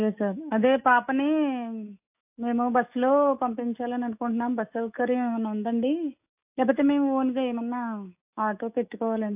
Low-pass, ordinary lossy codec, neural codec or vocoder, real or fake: 3.6 kHz; AAC, 32 kbps; autoencoder, 48 kHz, 128 numbers a frame, DAC-VAE, trained on Japanese speech; fake